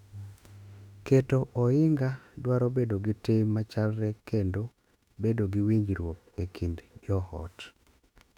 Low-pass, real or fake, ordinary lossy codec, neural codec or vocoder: 19.8 kHz; fake; none; autoencoder, 48 kHz, 32 numbers a frame, DAC-VAE, trained on Japanese speech